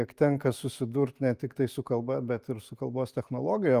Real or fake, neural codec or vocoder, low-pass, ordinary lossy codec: real; none; 14.4 kHz; Opus, 32 kbps